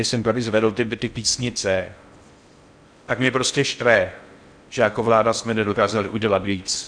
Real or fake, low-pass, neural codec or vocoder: fake; 9.9 kHz; codec, 16 kHz in and 24 kHz out, 0.6 kbps, FocalCodec, streaming, 4096 codes